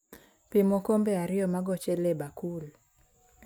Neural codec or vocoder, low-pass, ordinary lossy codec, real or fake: none; none; none; real